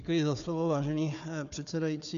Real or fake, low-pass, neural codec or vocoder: fake; 7.2 kHz; codec, 16 kHz, 4 kbps, FunCodec, trained on Chinese and English, 50 frames a second